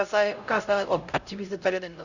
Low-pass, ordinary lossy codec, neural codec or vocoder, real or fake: 7.2 kHz; none; codec, 16 kHz, 0.5 kbps, X-Codec, HuBERT features, trained on LibriSpeech; fake